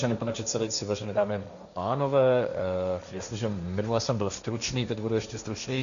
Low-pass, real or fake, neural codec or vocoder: 7.2 kHz; fake; codec, 16 kHz, 1.1 kbps, Voila-Tokenizer